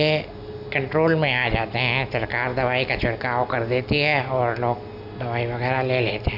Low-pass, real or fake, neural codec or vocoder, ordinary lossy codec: 5.4 kHz; real; none; none